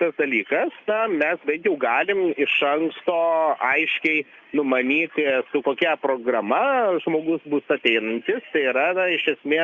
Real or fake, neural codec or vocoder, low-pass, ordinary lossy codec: real; none; 7.2 kHz; Opus, 64 kbps